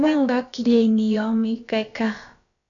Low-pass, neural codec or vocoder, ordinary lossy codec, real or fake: 7.2 kHz; codec, 16 kHz, about 1 kbps, DyCAST, with the encoder's durations; MP3, 96 kbps; fake